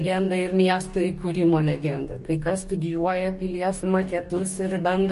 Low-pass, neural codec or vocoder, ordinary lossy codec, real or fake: 14.4 kHz; codec, 44.1 kHz, 2.6 kbps, DAC; MP3, 48 kbps; fake